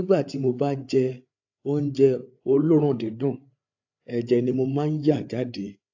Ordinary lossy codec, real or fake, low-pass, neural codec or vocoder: AAC, 48 kbps; fake; 7.2 kHz; codec, 16 kHz, 8 kbps, FreqCodec, larger model